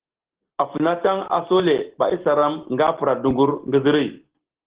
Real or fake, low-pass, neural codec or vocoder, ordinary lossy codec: real; 3.6 kHz; none; Opus, 16 kbps